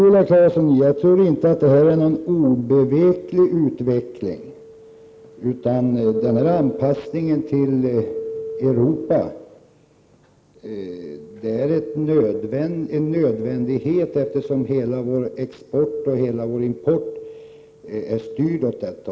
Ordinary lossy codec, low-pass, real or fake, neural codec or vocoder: none; none; real; none